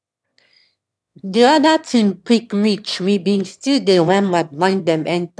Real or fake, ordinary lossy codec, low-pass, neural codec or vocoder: fake; none; none; autoencoder, 22.05 kHz, a latent of 192 numbers a frame, VITS, trained on one speaker